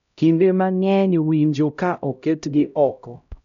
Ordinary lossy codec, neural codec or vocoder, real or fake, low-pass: none; codec, 16 kHz, 0.5 kbps, X-Codec, HuBERT features, trained on LibriSpeech; fake; 7.2 kHz